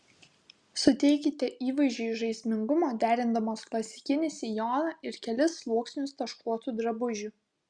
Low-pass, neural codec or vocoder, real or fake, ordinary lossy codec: 9.9 kHz; none; real; Opus, 64 kbps